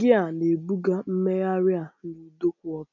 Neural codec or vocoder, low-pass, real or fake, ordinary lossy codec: none; 7.2 kHz; real; none